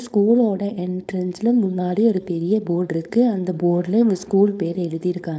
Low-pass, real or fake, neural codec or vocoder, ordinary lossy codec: none; fake; codec, 16 kHz, 4.8 kbps, FACodec; none